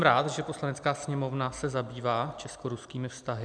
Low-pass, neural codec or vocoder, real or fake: 9.9 kHz; none; real